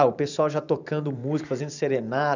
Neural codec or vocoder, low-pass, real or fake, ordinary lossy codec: vocoder, 44.1 kHz, 128 mel bands every 512 samples, BigVGAN v2; 7.2 kHz; fake; none